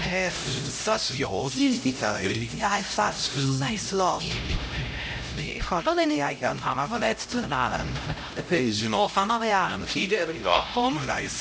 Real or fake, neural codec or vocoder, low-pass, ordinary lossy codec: fake; codec, 16 kHz, 0.5 kbps, X-Codec, HuBERT features, trained on LibriSpeech; none; none